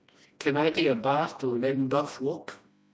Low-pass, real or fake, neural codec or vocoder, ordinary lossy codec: none; fake; codec, 16 kHz, 1 kbps, FreqCodec, smaller model; none